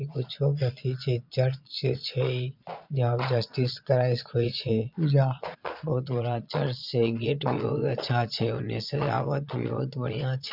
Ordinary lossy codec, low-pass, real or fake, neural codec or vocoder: none; 5.4 kHz; fake; vocoder, 22.05 kHz, 80 mel bands, Vocos